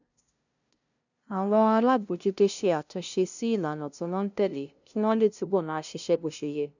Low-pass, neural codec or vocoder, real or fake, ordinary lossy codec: 7.2 kHz; codec, 16 kHz, 0.5 kbps, FunCodec, trained on LibriTTS, 25 frames a second; fake; none